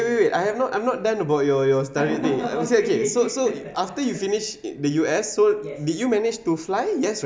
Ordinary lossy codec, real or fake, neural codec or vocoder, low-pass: none; real; none; none